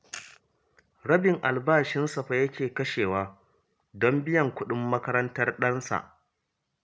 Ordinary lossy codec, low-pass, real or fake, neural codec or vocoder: none; none; real; none